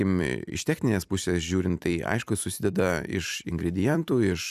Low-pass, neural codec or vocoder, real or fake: 14.4 kHz; vocoder, 44.1 kHz, 128 mel bands every 256 samples, BigVGAN v2; fake